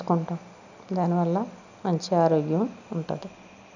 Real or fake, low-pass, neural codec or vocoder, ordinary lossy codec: real; 7.2 kHz; none; none